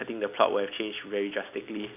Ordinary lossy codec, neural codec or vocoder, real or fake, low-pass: none; none; real; 3.6 kHz